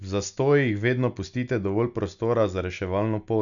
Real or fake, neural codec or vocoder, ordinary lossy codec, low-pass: real; none; none; 7.2 kHz